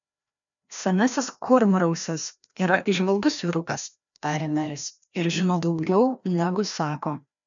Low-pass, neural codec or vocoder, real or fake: 7.2 kHz; codec, 16 kHz, 1 kbps, FreqCodec, larger model; fake